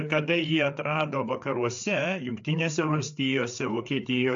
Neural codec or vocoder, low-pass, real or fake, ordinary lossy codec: codec, 16 kHz, 4 kbps, FreqCodec, larger model; 7.2 kHz; fake; AAC, 64 kbps